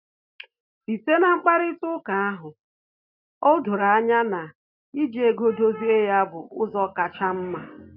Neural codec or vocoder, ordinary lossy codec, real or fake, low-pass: none; none; real; 5.4 kHz